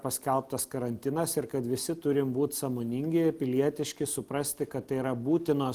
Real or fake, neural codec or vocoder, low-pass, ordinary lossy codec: real; none; 14.4 kHz; Opus, 24 kbps